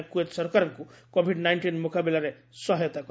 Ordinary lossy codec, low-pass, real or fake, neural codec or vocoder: none; none; real; none